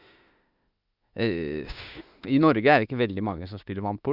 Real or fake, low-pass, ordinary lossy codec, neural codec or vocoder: fake; 5.4 kHz; Opus, 64 kbps; autoencoder, 48 kHz, 32 numbers a frame, DAC-VAE, trained on Japanese speech